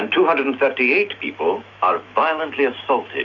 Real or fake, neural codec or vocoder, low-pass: fake; autoencoder, 48 kHz, 128 numbers a frame, DAC-VAE, trained on Japanese speech; 7.2 kHz